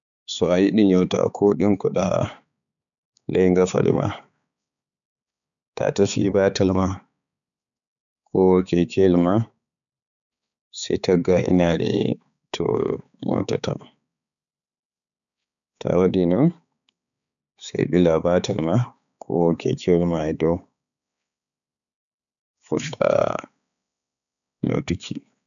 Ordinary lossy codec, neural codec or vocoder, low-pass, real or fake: none; codec, 16 kHz, 4 kbps, X-Codec, HuBERT features, trained on balanced general audio; 7.2 kHz; fake